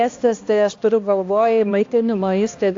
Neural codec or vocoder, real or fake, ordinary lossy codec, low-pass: codec, 16 kHz, 1 kbps, X-Codec, HuBERT features, trained on balanced general audio; fake; AAC, 48 kbps; 7.2 kHz